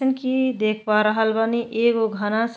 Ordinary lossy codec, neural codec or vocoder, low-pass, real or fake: none; none; none; real